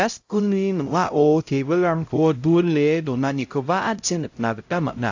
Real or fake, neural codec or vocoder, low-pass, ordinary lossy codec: fake; codec, 16 kHz, 0.5 kbps, X-Codec, HuBERT features, trained on LibriSpeech; 7.2 kHz; AAC, 48 kbps